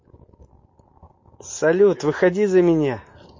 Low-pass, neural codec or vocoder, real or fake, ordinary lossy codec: 7.2 kHz; none; real; MP3, 32 kbps